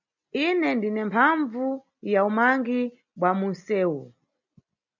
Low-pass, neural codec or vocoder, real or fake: 7.2 kHz; none; real